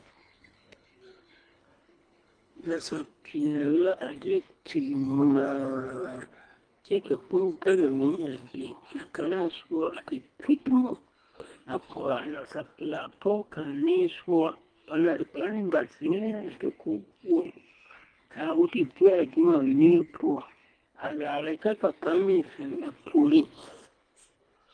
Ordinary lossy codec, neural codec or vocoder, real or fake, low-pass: Opus, 32 kbps; codec, 24 kHz, 1.5 kbps, HILCodec; fake; 9.9 kHz